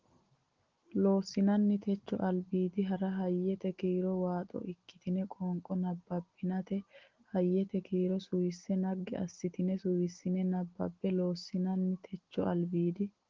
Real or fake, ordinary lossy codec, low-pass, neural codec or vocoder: real; Opus, 16 kbps; 7.2 kHz; none